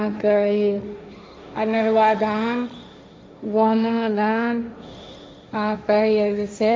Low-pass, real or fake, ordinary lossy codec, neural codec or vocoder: none; fake; none; codec, 16 kHz, 1.1 kbps, Voila-Tokenizer